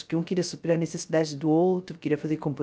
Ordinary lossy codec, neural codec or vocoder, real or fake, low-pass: none; codec, 16 kHz, 0.3 kbps, FocalCodec; fake; none